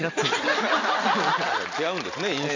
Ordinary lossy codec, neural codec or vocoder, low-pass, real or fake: AAC, 48 kbps; none; 7.2 kHz; real